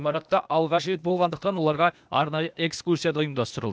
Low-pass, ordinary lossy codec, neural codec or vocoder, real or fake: none; none; codec, 16 kHz, 0.8 kbps, ZipCodec; fake